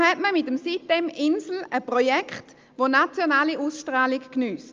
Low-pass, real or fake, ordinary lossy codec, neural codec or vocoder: 7.2 kHz; real; Opus, 24 kbps; none